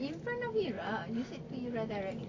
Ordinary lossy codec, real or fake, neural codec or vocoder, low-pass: AAC, 32 kbps; real; none; 7.2 kHz